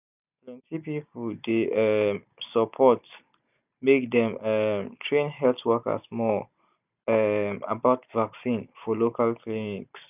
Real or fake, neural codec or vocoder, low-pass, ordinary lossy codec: real; none; 3.6 kHz; none